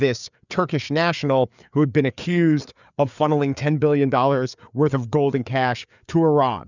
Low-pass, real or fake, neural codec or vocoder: 7.2 kHz; fake; codec, 16 kHz, 4 kbps, FreqCodec, larger model